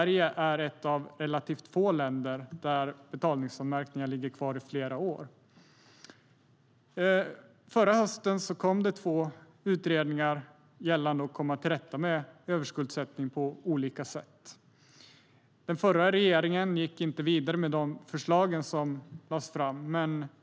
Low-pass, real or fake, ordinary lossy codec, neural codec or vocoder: none; real; none; none